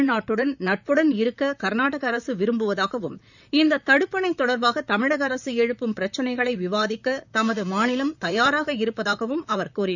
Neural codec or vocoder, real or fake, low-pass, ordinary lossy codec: vocoder, 44.1 kHz, 128 mel bands, Pupu-Vocoder; fake; 7.2 kHz; none